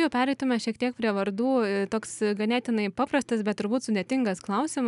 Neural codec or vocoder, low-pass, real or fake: none; 10.8 kHz; real